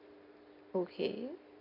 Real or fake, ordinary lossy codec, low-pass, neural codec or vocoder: real; none; 5.4 kHz; none